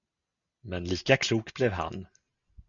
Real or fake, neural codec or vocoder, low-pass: real; none; 7.2 kHz